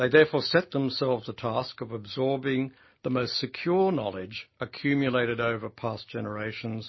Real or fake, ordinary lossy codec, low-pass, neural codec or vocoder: real; MP3, 24 kbps; 7.2 kHz; none